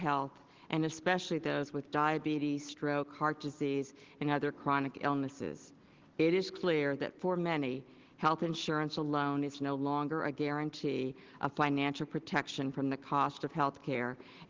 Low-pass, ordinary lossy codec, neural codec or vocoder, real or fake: 7.2 kHz; Opus, 16 kbps; none; real